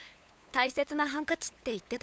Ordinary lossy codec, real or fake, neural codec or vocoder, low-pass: none; fake; codec, 16 kHz, 8 kbps, FunCodec, trained on LibriTTS, 25 frames a second; none